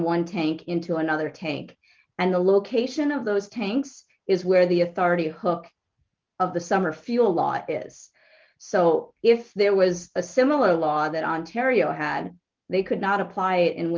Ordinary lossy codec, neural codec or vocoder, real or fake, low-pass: Opus, 32 kbps; none; real; 7.2 kHz